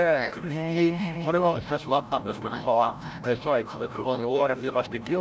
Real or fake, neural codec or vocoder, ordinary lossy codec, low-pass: fake; codec, 16 kHz, 0.5 kbps, FreqCodec, larger model; none; none